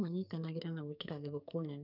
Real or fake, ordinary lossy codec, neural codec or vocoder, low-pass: fake; none; codec, 44.1 kHz, 2.6 kbps, SNAC; 5.4 kHz